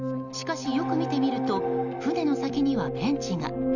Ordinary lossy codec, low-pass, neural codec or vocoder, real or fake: none; 7.2 kHz; none; real